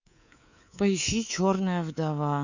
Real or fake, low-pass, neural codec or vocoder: fake; 7.2 kHz; codec, 24 kHz, 3.1 kbps, DualCodec